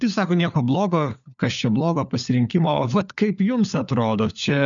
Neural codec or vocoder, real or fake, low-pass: codec, 16 kHz, 4 kbps, FunCodec, trained on LibriTTS, 50 frames a second; fake; 7.2 kHz